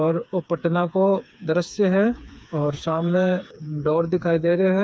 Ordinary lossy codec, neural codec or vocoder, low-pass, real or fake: none; codec, 16 kHz, 4 kbps, FreqCodec, smaller model; none; fake